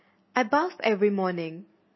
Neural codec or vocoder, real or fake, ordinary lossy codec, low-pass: none; real; MP3, 24 kbps; 7.2 kHz